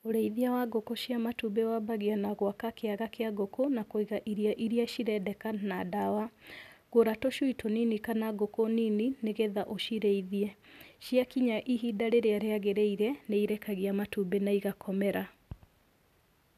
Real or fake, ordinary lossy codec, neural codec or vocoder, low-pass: real; none; none; 14.4 kHz